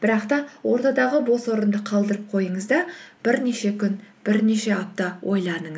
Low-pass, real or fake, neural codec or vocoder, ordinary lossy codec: none; real; none; none